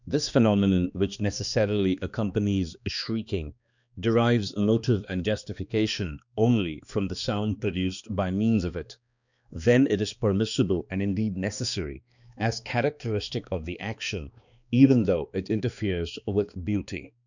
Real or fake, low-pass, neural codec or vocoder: fake; 7.2 kHz; codec, 16 kHz, 2 kbps, X-Codec, HuBERT features, trained on balanced general audio